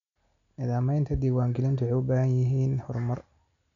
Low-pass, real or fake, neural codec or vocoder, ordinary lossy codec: 7.2 kHz; real; none; none